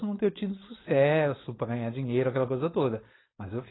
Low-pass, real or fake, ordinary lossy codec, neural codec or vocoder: 7.2 kHz; fake; AAC, 16 kbps; codec, 16 kHz, 4.8 kbps, FACodec